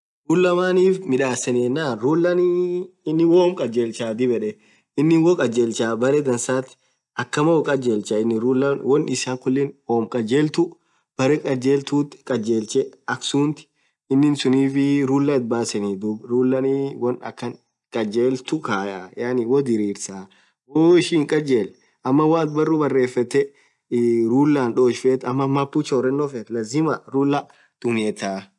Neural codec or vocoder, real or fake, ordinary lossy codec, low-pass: none; real; MP3, 96 kbps; 10.8 kHz